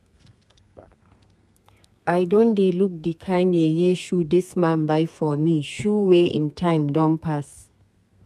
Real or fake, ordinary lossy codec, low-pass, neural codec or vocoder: fake; none; 14.4 kHz; codec, 32 kHz, 1.9 kbps, SNAC